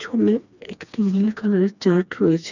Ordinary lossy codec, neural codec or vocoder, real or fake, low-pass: none; codec, 16 kHz, 2 kbps, FreqCodec, smaller model; fake; 7.2 kHz